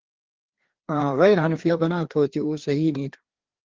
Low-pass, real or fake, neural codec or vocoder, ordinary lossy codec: 7.2 kHz; fake; codec, 16 kHz, 2 kbps, FreqCodec, larger model; Opus, 16 kbps